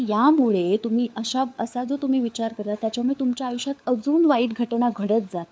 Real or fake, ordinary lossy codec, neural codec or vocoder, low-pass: fake; none; codec, 16 kHz, 4 kbps, FunCodec, trained on LibriTTS, 50 frames a second; none